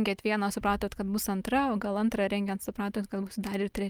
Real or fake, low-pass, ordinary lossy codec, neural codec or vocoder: real; 19.8 kHz; Opus, 32 kbps; none